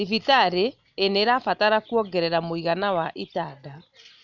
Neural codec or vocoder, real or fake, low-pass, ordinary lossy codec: none; real; 7.2 kHz; none